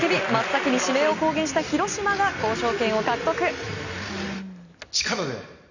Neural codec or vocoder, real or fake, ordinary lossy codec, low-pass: none; real; none; 7.2 kHz